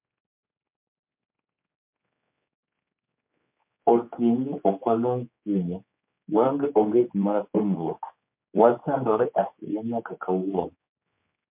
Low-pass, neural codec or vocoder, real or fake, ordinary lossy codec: 3.6 kHz; codec, 16 kHz, 4 kbps, X-Codec, HuBERT features, trained on general audio; fake; MP3, 32 kbps